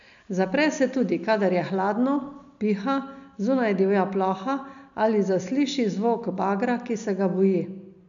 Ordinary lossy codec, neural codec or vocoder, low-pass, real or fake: none; none; 7.2 kHz; real